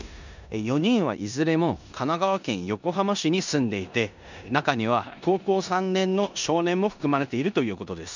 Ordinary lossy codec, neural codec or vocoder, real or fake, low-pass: none; codec, 16 kHz in and 24 kHz out, 0.9 kbps, LongCat-Audio-Codec, four codebook decoder; fake; 7.2 kHz